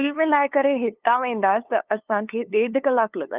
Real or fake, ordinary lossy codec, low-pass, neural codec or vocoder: fake; Opus, 32 kbps; 3.6 kHz; codec, 16 kHz, 2 kbps, FunCodec, trained on LibriTTS, 25 frames a second